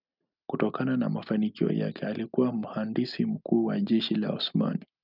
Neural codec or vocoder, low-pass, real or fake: none; 5.4 kHz; real